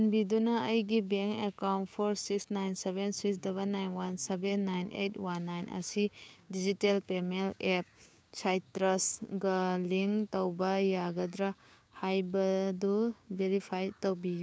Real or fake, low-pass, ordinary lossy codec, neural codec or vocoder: fake; none; none; codec, 16 kHz, 6 kbps, DAC